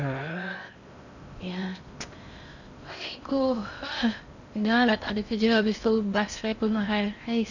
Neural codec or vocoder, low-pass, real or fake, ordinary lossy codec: codec, 16 kHz in and 24 kHz out, 0.6 kbps, FocalCodec, streaming, 4096 codes; 7.2 kHz; fake; none